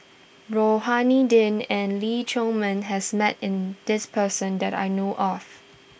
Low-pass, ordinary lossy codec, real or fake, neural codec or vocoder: none; none; real; none